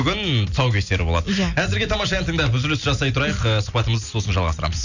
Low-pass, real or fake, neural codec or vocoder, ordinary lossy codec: 7.2 kHz; real; none; none